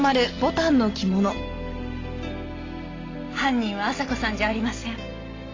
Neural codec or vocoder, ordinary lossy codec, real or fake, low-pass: none; AAC, 32 kbps; real; 7.2 kHz